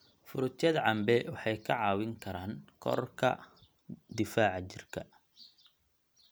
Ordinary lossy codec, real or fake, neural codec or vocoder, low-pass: none; real; none; none